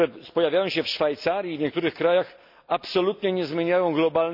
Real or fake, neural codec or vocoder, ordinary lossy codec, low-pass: real; none; MP3, 48 kbps; 5.4 kHz